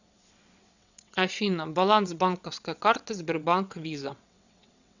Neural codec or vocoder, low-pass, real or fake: vocoder, 22.05 kHz, 80 mel bands, WaveNeXt; 7.2 kHz; fake